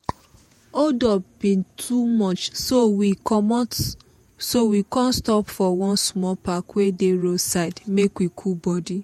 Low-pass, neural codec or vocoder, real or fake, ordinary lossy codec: 19.8 kHz; vocoder, 48 kHz, 128 mel bands, Vocos; fake; MP3, 64 kbps